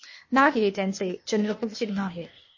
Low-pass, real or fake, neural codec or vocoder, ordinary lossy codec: 7.2 kHz; fake; codec, 16 kHz, 0.8 kbps, ZipCodec; MP3, 32 kbps